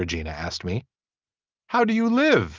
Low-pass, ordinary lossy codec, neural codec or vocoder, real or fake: 7.2 kHz; Opus, 24 kbps; none; real